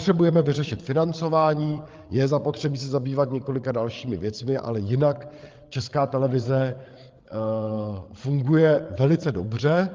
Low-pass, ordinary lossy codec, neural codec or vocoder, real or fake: 7.2 kHz; Opus, 24 kbps; codec, 16 kHz, 8 kbps, FreqCodec, larger model; fake